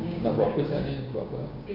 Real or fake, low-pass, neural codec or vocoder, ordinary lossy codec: fake; 5.4 kHz; codec, 16 kHz, 6 kbps, DAC; none